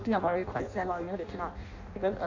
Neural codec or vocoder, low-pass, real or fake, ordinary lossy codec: codec, 16 kHz in and 24 kHz out, 0.6 kbps, FireRedTTS-2 codec; 7.2 kHz; fake; none